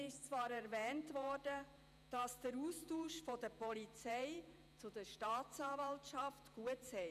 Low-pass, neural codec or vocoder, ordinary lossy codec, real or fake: 14.4 kHz; vocoder, 48 kHz, 128 mel bands, Vocos; none; fake